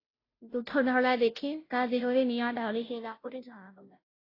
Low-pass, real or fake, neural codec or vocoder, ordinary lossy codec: 5.4 kHz; fake; codec, 16 kHz, 0.5 kbps, FunCodec, trained on Chinese and English, 25 frames a second; AAC, 24 kbps